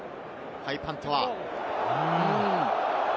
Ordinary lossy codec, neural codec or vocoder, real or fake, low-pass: none; none; real; none